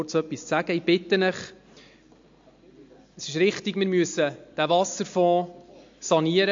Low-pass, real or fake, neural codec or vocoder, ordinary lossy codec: 7.2 kHz; real; none; MP3, 48 kbps